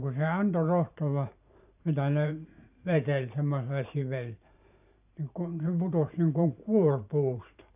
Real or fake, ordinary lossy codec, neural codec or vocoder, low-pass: fake; none; vocoder, 44.1 kHz, 128 mel bands every 512 samples, BigVGAN v2; 3.6 kHz